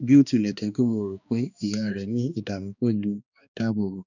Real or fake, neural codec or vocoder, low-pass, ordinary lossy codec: fake; codec, 16 kHz, 2 kbps, X-Codec, HuBERT features, trained on balanced general audio; 7.2 kHz; none